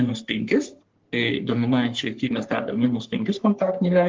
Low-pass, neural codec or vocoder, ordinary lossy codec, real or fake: 7.2 kHz; codec, 44.1 kHz, 3.4 kbps, Pupu-Codec; Opus, 16 kbps; fake